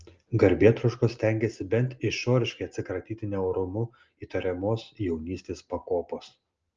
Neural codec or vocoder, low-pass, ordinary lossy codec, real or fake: none; 7.2 kHz; Opus, 24 kbps; real